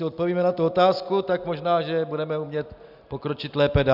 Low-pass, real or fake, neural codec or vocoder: 5.4 kHz; real; none